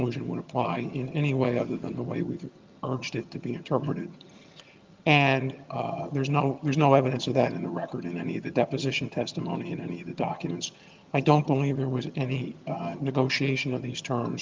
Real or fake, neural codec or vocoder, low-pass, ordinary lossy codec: fake; vocoder, 22.05 kHz, 80 mel bands, HiFi-GAN; 7.2 kHz; Opus, 32 kbps